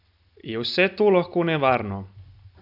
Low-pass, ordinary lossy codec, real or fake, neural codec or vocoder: 5.4 kHz; none; real; none